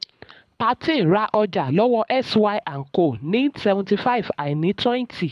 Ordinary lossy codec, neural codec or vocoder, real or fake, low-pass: none; codec, 24 kHz, 6 kbps, HILCodec; fake; none